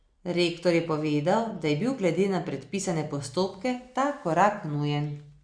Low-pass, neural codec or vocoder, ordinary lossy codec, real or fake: 9.9 kHz; none; Opus, 64 kbps; real